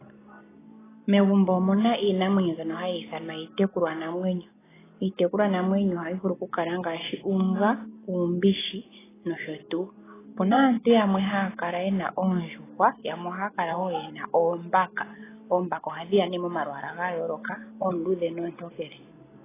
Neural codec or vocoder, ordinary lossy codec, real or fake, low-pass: none; AAC, 16 kbps; real; 3.6 kHz